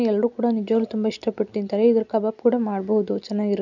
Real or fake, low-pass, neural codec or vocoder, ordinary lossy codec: real; 7.2 kHz; none; none